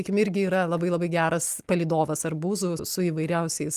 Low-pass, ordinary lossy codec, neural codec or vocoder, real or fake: 14.4 kHz; Opus, 32 kbps; none; real